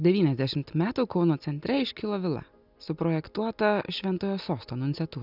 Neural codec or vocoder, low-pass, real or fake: none; 5.4 kHz; real